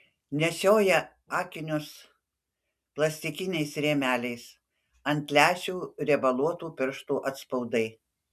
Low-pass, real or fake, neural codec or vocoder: 14.4 kHz; real; none